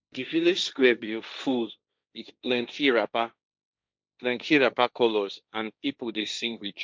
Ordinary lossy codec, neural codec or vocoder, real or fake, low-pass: none; codec, 16 kHz, 1.1 kbps, Voila-Tokenizer; fake; none